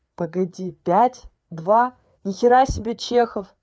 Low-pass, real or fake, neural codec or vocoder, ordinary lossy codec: none; fake; codec, 16 kHz, 4 kbps, FreqCodec, larger model; none